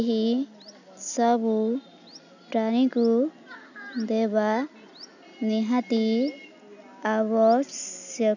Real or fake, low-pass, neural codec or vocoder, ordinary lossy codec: real; 7.2 kHz; none; none